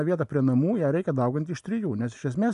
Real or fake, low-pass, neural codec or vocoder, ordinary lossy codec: real; 10.8 kHz; none; AAC, 96 kbps